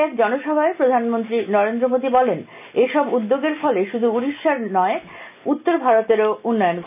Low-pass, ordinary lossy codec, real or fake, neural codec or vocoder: 3.6 kHz; none; real; none